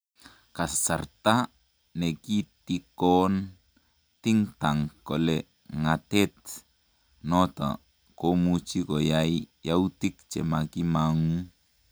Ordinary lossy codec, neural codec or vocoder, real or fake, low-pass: none; none; real; none